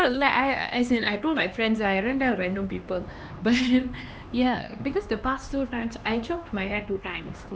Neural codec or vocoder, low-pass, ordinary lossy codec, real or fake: codec, 16 kHz, 2 kbps, X-Codec, HuBERT features, trained on LibriSpeech; none; none; fake